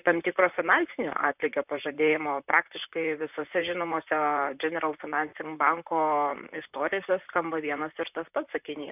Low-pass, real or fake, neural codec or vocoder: 3.6 kHz; fake; vocoder, 44.1 kHz, 128 mel bands, Pupu-Vocoder